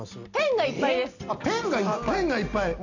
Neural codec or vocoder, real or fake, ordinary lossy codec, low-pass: none; real; none; 7.2 kHz